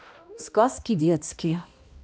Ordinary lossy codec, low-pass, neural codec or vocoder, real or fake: none; none; codec, 16 kHz, 1 kbps, X-Codec, HuBERT features, trained on balanced general audio; fake